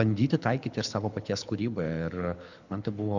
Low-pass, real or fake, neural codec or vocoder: 7.2 kHz; fake; codec, 24 kHz, 6 kbps, HILCodec